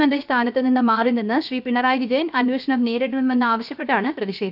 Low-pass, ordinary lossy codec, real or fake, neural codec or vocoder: 5.4 kHz; none; fake; codec, 16 kHz, 0.7 kbps, FocalCodec